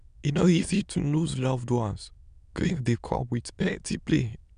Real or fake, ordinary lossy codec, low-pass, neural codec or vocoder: fake; MP3, 96 kbps; 9.9 kHz; autoencoder, 22.05 kHz, a latent of 192 numbers a frame, VITS, trained on many speakers